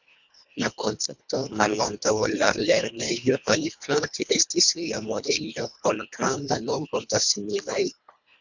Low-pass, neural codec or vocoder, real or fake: 7.2 kHz; codec, 24 kHz, 1.5 kbps, HILCodec; fake